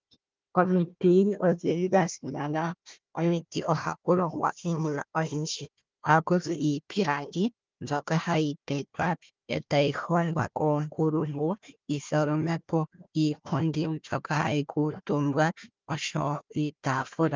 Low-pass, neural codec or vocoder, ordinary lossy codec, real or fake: 7.2 kHz; codec, 16 kHz, 1 kbps, FunCodec, trained on Chinese and English, 50 frames a second; Opus, 32 kbps; fake